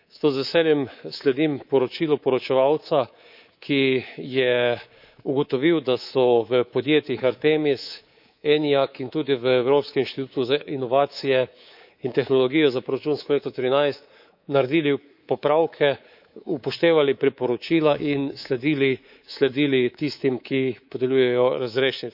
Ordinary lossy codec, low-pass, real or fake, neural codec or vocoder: none; 5.4 kHz; fake; codec, 24 kHz, 3.1 kbps, DualCodec